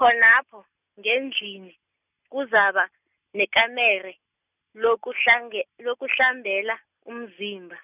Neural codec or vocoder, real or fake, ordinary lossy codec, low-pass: none; real; none; 3.6 kHz